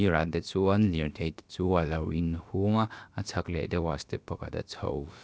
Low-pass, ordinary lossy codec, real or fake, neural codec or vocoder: none; none; fake; codec, 16 kHz, about 1 kbps, DyCAST, with the encoder's durations